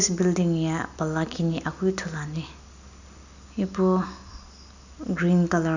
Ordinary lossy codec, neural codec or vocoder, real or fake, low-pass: none; none; real; 7.2 kHz